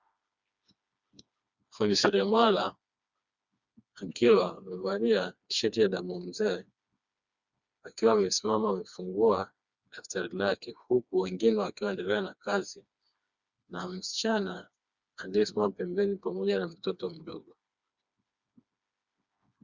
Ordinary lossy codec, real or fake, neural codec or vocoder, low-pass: Opus, 64 kbps; fake; codec, 16 kHz, 2 kbps, FreqCodec, smaller model; 7.2 kHz